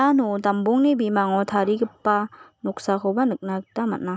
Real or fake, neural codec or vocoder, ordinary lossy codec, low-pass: real; none; none; none